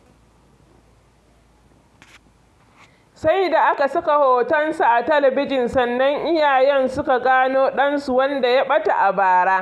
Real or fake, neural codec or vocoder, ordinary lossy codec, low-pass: real; none; none; none